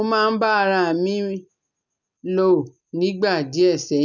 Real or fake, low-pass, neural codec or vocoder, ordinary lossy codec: real; 7.2 kHz; none; none